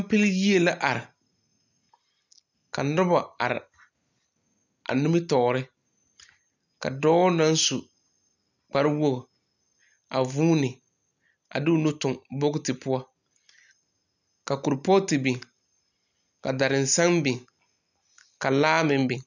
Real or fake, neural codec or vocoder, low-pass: real; none; 7.2 kHz